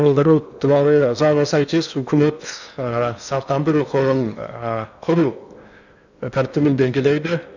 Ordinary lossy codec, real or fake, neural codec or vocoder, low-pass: none; fake; codec, 16 kHz in and 24 kHz out, 0.8 kbps, FocalCodec, streaming, 65536 codes; 7.2 kHz